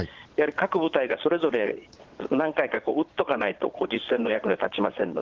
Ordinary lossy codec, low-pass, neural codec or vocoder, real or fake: Opus, 16 kbps; 7.2 kHz; vocoder, 22.05 kHz, 80 mel bands, Vocos; fake